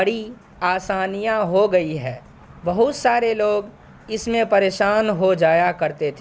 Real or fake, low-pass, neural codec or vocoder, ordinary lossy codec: real; none; none; none